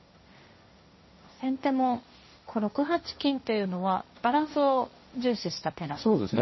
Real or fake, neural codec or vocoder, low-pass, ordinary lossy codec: fake; codec, 16 kHz, 1.1 kbps, Voila-Tokenizer; 7.2 kHz; MP3, 24 kbps